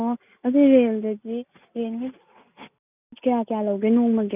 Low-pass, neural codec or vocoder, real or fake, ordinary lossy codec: 3.6 kHz; none; real; none